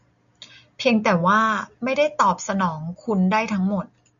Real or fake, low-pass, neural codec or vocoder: real; 7.2 kHz; none